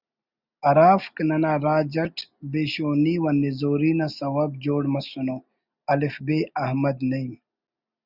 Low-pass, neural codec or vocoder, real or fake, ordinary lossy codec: 5.4 kHz; none; real; Opus, 64 kbps